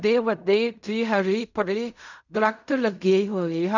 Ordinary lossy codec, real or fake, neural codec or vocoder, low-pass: none; fake; codec, 16 kHz in and 24 kHz out, 0.4 kbps, LongCat-Audio-Codec, fine tuned four codebook decoder; 7.2 kHz